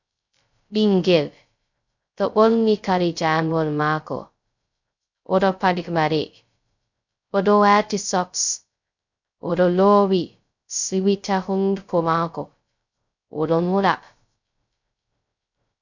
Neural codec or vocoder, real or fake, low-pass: codec, 16 kHz, 0.2 kbps, FocalCodec; fake; 7.2 kHz